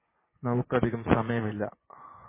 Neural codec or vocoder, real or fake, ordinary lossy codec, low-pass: vocoder, 24 kHz, 100 mel bands, Vocos; fake; MP3, 16 kbps; 3.6 kHz